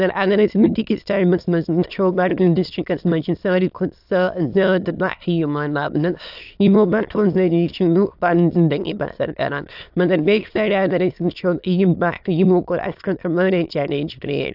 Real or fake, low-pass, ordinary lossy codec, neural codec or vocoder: fake; 5.4 kHz; AAC, 48 kbps; autoencoder, 22.05 kHz, a latent of 192 numbers a frame, VITS, trained on many speakers